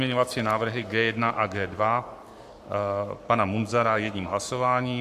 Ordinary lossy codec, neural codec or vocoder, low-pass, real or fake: AAC, 96 kbps; codec, 44.1 kHz, 7.8 kbps, Pupu-Codec; 14.4 kHz; fake